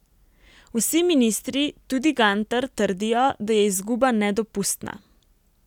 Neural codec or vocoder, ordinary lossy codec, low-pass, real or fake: none; none; 19.8 kHz; real